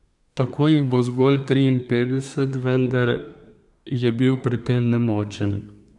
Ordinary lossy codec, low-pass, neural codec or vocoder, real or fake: none; 10.8 kHz; codec, 24 kHz, 1 kbps, SNAC; fake